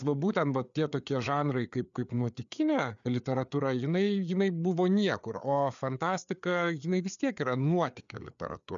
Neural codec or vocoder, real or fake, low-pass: codec, 16 kHz, 4 kbps, FreqCodec, larger model; fake; 7.2 kHz